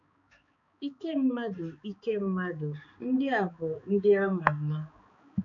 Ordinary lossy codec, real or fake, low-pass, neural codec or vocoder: none; fake; 7.2 kHz; codec, 16 kHz, 4 kbps, X-Codec, HuBERT features, trained on balanced general audio